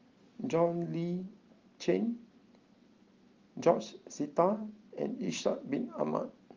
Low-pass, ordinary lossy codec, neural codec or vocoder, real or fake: 7.2 kHz; Opus, 32 kbps; none; real